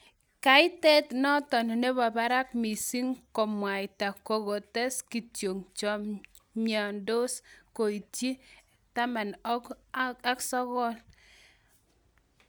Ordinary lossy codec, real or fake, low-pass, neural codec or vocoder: none; real; none; none